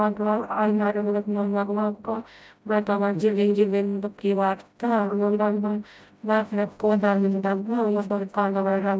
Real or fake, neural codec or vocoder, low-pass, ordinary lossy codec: fake; codec, 16 kHz, 0.5 kbps, FreqCodec, smaller model; none; none